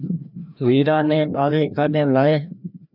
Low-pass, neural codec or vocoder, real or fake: 5.4 kHz; codec, 16 kHz, 1 kbps, FreqCodec, larger model; fake